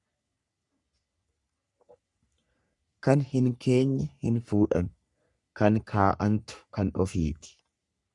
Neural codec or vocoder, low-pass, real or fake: codec, 44.1 kHz, 3.4 kbps, Pupu-Codec; 10.8 kHz; fake